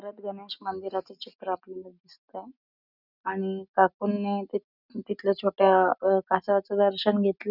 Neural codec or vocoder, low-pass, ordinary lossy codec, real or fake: none; 5.4 kHz; none; real